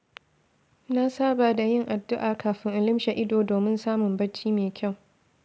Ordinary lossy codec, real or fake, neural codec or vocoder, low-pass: none; real; none; none